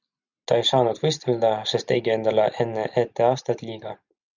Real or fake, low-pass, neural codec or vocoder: real; 7.2 kHz; none